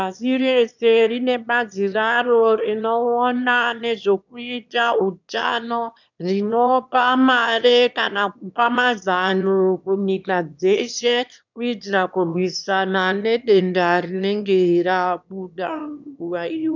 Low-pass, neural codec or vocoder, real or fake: 7.2 kHz; autoencoder, 22.05 kHz, a latent of 192 numbers a frame, VITS, trained on one speaker; fake